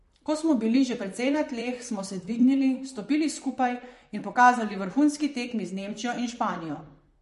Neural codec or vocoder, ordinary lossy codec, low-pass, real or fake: vocoder, 44.1 kHz, 128 mel bands, Pupu-Vocoder; MP3, 48 kbps; 14.4 kHz; fake